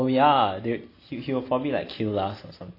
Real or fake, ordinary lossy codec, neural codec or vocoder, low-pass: real; AAC, 32 kbps; none; 5.4 kHz